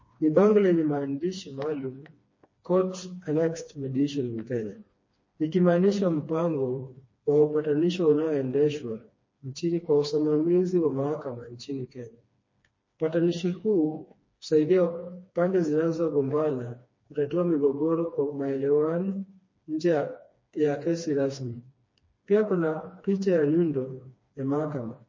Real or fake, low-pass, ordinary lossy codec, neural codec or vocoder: fake; 7.2 kHz; MP3, 32 kbps; codec, 16 kHz, 2 kbps, FreqCodec, smaller model